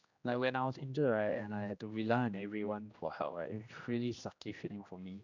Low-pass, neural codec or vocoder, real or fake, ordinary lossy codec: 7.2 kHz; codec, 16 kHz, 1 kbps, X-Codec, HuBERT features, trained on general audio; fake; none